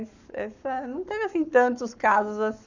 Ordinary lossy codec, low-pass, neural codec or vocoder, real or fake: none; 7.2 kHz; codec, 44.1 kHz, 7.8 kbps, Pupu-Codec; fake